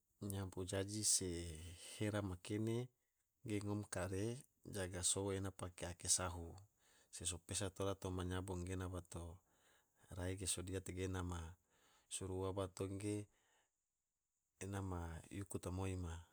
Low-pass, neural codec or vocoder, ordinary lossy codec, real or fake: none; none; none; real